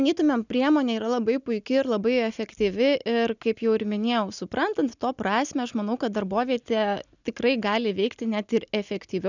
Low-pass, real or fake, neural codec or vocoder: 7.2 kHz; real; none